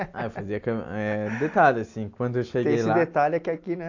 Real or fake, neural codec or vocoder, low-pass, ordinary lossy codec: real; none; 7.2 kHz; AAC, 48 kbps